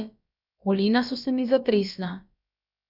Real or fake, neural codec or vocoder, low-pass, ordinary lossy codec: fake; codec, 16 kHz, about 1 kbps, DyCAST, with the encoder's durations; 5.4 kHz; none